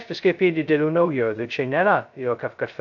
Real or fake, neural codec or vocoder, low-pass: fake; codec, 16 kHz, 0.2 kbps, FocalCodec; 7.2 kHz